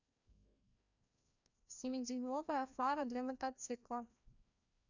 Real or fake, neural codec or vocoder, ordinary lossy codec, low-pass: fake; codec, 16 kHz, 1 kbps, FreqCodec, larger model; none; 7.2 kHz